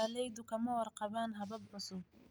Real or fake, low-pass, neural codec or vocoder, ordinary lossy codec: real; none; none; none